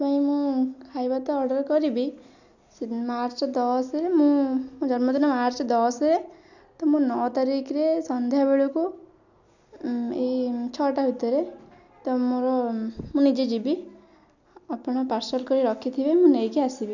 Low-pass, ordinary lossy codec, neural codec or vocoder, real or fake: 7.2 kHz; none; none; real